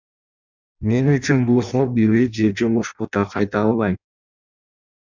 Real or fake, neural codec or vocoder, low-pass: fake; codec, 16 kHz in and 24 kHz out, 0.6 kbps, FireRedTTS-2 codec; 7.2 kHz